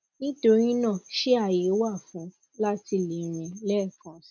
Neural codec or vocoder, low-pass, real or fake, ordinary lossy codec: none; 7.2 kHz; real; none